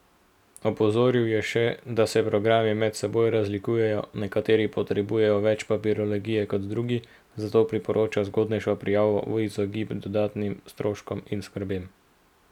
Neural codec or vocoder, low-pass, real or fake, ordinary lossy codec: none; 19.8 kHz; real; none